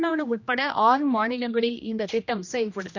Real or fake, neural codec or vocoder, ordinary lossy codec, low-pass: fake; codec, 16 kHz, 1 kbps, X-Codec, HuBERT features, trained on general audio; none; 7.2 kHz